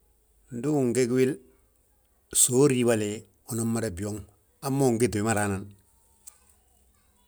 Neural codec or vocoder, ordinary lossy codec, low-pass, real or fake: none; none; none; real